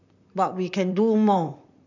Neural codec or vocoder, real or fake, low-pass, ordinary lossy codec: vocoder, 44.1 kHz, 128 mel bands, Pupu-Vocoder; fake; 7.2 kHz; none